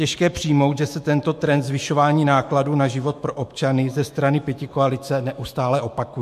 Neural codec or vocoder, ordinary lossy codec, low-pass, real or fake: autoencoder, 48 kHz, 128 numbers a frame, DAC-VAE, trained on Japanese speech; MP3, 64 kbps; 14.4 kHz; fake